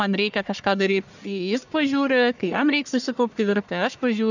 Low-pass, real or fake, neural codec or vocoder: 7.2 kHz; fake; codec, 44.1 kHz, 1.7 kbps, Pupu-Codec